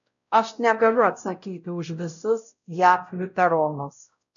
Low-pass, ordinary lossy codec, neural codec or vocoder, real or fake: 7.2 kHz; MP3, 96 kbps; codec, 16 kHz, 0.5 kbps, X-Codec, WavLM features, trained on Multilingual LibriSpeech; fake